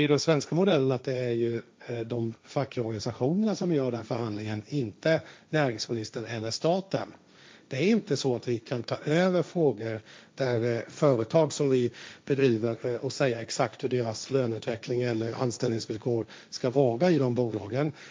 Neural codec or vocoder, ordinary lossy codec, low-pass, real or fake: codec, 16 kHz, 1.1 kbps, Voila-Tokenizer; none; none; fake